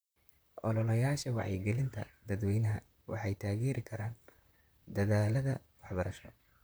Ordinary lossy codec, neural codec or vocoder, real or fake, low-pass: none; vocoder, 44.1 kHz, 128 mel bands, Pupu-Vocoder; fake; none